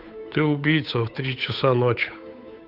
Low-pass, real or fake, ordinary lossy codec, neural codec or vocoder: 5.4 kHz; fake; none; codec, 16 kHz, 8 kbps, FunCodec, trained on Chinese and English, 25 frames a second